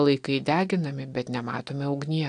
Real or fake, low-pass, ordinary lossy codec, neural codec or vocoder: real; 9.9 kHz; AAC, 64 kbps; none